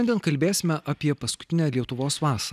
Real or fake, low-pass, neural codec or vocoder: real; 14.4 kHz; none